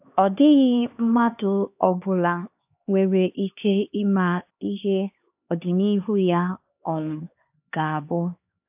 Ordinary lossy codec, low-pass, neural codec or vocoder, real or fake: AAC, 32 kbps; 3.6 kHz; codec, 16 kHz, 2 kbps, X-Codec, HuBERT features, trained on LibriSpeech; fake